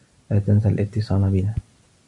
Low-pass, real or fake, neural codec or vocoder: 10.8 kHz; real; none